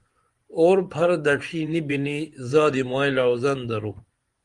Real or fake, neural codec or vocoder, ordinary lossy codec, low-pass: fake; vocoder, 24 kHz, 100 mel bands, Vocos; Opus, 24 kbps; 10.8 kHz